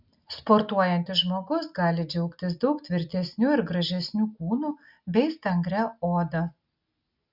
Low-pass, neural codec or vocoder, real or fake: 5.4 kHz; none; real